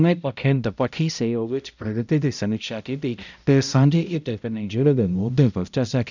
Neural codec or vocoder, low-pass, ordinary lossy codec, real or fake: codec, 16 kHz, 0.5 kbps, X-Codec, HuBERT features, trained on balanced general audio; 7.2 kHz; none; fake